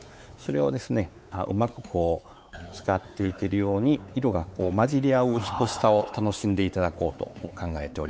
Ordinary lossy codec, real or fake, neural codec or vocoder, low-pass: none; fake; codec, 16 kHz, 4 kbps, X-Codec, WavLM features, trained on Multilingual LibriSpeech; none